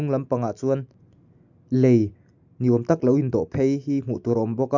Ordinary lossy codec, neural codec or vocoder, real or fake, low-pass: none; none; real; 7.2 kHz